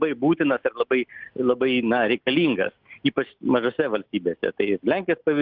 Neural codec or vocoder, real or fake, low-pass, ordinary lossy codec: none; real; 5.4 kHz; Opus, 32 kbps